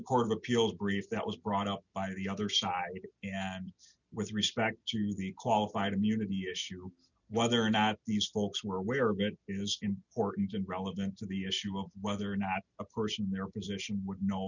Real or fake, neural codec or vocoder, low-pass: real; none; 7.2 kHz